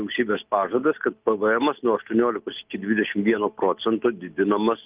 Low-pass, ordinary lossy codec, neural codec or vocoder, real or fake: 3.6 kHz; Opus, 32 kbps; none; real